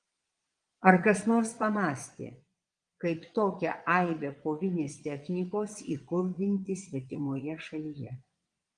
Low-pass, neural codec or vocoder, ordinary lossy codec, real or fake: 9.9 kHz; vocoder, 22.05 kHz, 80 mel bands, Vocos; Opus, 24 kbps; fake